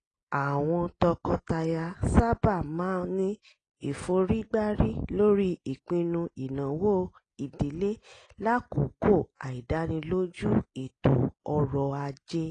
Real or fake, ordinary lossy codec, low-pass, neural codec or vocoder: real; AAC, 32 kbps; 9.9 kHz; none